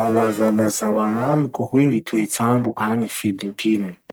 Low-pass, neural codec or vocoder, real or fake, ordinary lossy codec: none; codec, 44.1 kHz, 1.7 kbps, Pupu-Codec; fake; none